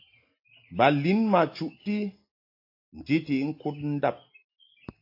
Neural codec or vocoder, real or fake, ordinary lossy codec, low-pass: none; real; AAC, 32 kbps; 5.4 kHz